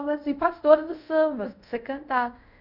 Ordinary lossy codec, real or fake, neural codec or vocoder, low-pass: none; fake; codec, 24 kHz, 0.5 kbps, DualCodec; 5.4 kHz